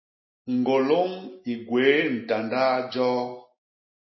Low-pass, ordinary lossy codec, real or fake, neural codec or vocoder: 7.2 kHz; MP3, 24 kbps; real; none